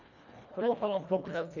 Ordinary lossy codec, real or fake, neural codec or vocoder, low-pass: none; fake; codec, 24 kHz, 1.5 kbps, HILCodec; 7.2 kHz